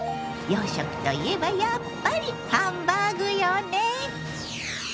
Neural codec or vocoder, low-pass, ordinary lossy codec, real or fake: none; none; none; real